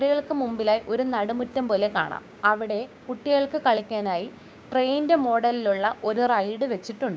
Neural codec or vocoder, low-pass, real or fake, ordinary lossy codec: codec, 16 kHz, 6 kbps, DAC; none; fake; none